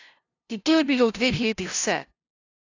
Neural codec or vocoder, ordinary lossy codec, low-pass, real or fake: codec, 16 kHz, 0.5 kbps, FunCodec, trained on LibriTTS, 25 frames a second; AAC, 48 kbps; 7.2 kHz; fake